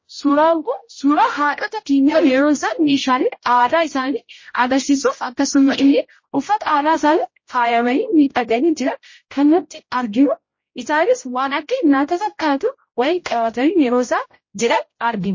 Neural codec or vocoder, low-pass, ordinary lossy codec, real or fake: codec, 16 kHz, 0.5 kbps, X-Codec, HuBERT features, trained on general audio; 7.2 kHz; MP3, 32 kbps; fake